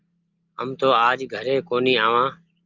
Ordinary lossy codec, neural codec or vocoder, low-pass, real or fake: Opus, 24 kbps; none; 7.2 kHz; real